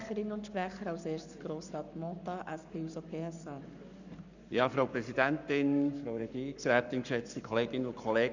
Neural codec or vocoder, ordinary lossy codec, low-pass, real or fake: codec, 44.1 kHz, 7.8 kbps, Pupu-Codec; none; 7.2 kHz; fake